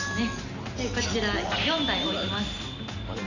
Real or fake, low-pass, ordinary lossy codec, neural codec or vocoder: real; 7.2 kHz; none; none